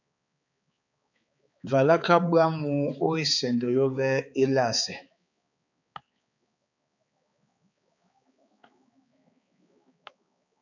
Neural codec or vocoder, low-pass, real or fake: codec, 16 kHz, 4 kbps, X-Codec, HuBERT features, trained on balanced general audio; 7.2 kHz; fake